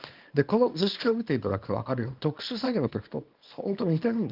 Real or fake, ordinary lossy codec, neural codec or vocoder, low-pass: fake; Opus, 32 kbps; codec, 24 kHz, 0.9 kbps, WavTokenizer, small release; 5.4 kHz